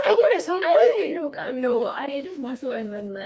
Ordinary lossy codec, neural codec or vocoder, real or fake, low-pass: none; codec, 16 kHz, 1 kbps, FreqCodec, larger model; fake; none